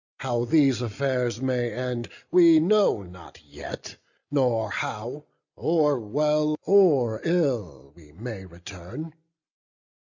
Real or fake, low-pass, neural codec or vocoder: real; 7.2 kHz; none